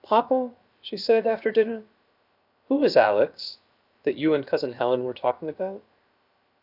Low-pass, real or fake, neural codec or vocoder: 5.4 kHz; fake; codec, 16 kHz, 0.7 kbps, FocalCodec